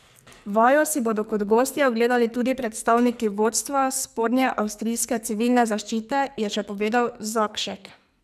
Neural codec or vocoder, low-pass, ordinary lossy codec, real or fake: codec, 44.1 kHz, 2.6 kbps, SNAC; 14.4 kHz; none; fake